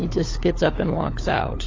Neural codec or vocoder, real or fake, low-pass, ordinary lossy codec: codec, 16 kHz, 4 kbps, FunCodec, trained on LibriTTS, 50 frames a second; fake; 7.2 kHz; AAC, 32 kbps